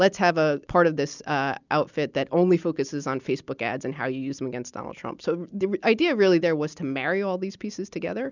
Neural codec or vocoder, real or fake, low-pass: none; real; 7.2 kHz